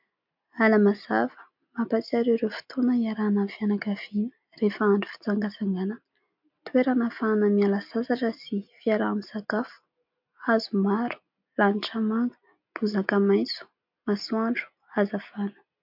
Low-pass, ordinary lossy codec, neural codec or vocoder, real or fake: 5.4 kHz; MP3, 48 kbps; none; real